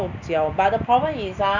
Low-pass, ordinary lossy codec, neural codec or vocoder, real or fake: 7.2 kHz; none; none; real